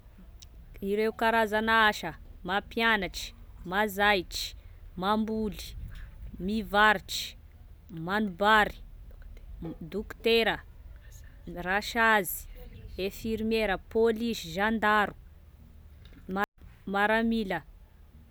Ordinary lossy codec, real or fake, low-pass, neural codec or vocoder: none; real; none; none